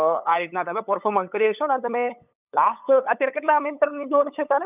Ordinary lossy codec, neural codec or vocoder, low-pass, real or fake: none; codec, 16 kHz, 8 kbps, FunCodec, trained on LibriTTS, 25 frames a second; 3.6 kHz; fake